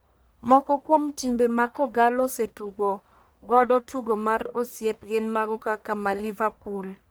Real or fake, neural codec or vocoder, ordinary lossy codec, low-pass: fake; codec, 44.1 kHz, 1.7 kbps, Pupu-Codec; none; none